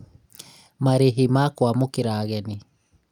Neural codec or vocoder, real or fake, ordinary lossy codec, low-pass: none; real; none; 19.8 kHz